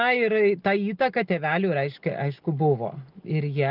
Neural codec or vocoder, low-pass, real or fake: none; 5.4 kHz; real